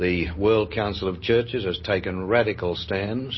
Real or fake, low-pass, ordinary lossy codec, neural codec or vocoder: real; 7.2 kHz; MP3, 24 kbps; none